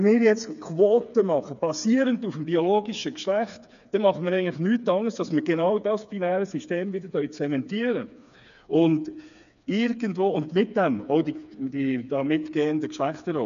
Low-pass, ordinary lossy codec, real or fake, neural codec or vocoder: 7.2 kHz; none; fake; codec, 16 kHz, 4 kbps, FreqCodec, smaller model